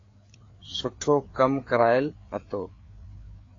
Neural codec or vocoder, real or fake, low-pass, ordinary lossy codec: codec, 16 kHz, 4 kbps, FreqCodec, larger model; fake; 7.2 kHz; AAC, 32 kbps